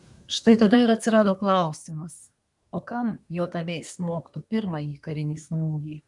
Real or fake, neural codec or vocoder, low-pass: fake; codec, 24 kHz, 1 kbps, SNAC; 10.8 kHz